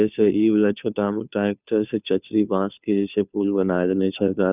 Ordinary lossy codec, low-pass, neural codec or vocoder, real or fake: none; 3.6 kHz; codec, 16 kHz, 2 kbps, FunCodec, trained on Chinese and English, 25 frames a second; fake